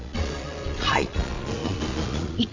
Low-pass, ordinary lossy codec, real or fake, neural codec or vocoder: 7.2 kHz; MP3, 64 kbps; fake; vocoder, 22.05 kHz, 80 mel bands, Vocos